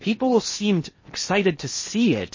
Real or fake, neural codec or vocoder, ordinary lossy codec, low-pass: fake; codec, 16 kHz in and 24 kHz out, 0.6 kbps, FocalCodec, streaming, 4096 codes; MP3, 32 kbps; 7.2 kHz